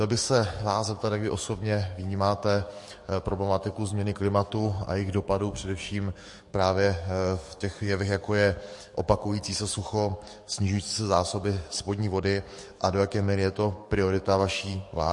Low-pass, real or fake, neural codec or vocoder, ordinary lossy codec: 10.8 kHz; fake; codec, 44.1 kHz, 7.8 kbps, DAC; MP3, 48 kbps